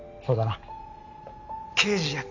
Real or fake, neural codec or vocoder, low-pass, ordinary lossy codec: real; none; 7.2 kHz; none